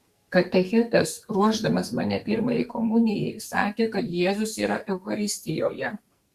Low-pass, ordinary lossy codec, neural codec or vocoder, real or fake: 14.4 kHz; Opus, 64 kbps; codec, 44.1 kHz, 2.6 kbps, SNAC; fake